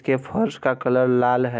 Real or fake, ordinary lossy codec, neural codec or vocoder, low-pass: real; none; none; none